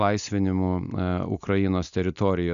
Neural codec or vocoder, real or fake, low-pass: none; real; 7.2 kHz